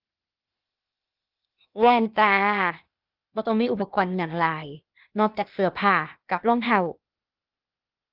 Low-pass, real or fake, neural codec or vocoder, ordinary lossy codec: 5.4 kHz; fake; codec, 16 kHz, 0.8 kbps, ZipCodec; Opus, 32 kbps